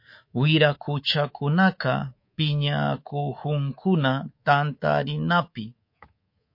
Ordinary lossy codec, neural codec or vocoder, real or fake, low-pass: MP3, 32 kbps; codec, 24 kHz, 3.1 kbps, DualCodec; fake; 5.4 kHz